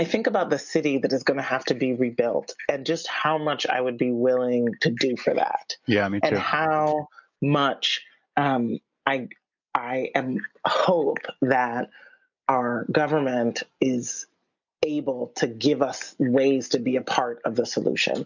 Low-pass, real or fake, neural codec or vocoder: 7.2 kHz; real; none